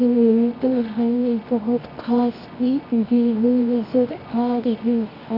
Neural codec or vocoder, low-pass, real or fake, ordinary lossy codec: codec, 24 kHz, 0.9 kbps, WavTokenizer, medium music audio release; 5.4 kHz; fake; Opus, 64 kbps